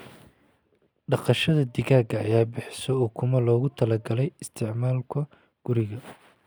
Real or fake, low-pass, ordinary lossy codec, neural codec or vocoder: real; none; none; none